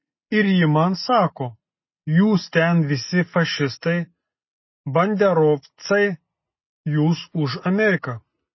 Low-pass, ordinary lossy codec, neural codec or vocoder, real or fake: 7.2 kHz; MP3, 24 kbps; none; real